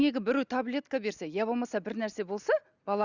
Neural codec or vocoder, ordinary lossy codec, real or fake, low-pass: none; Opus, 64 kbps; real; 7.2 kHz